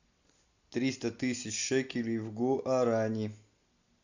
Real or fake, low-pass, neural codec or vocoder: real; 7.2 kHz; none